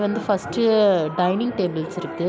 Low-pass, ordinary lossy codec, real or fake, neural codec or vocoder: none; none; real; none